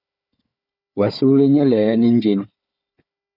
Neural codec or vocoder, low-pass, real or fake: codec, 16 kHz, 4 kbps, FunCodec, trained on Chinese and English, 50 frames a second; 5.4 kHz; fake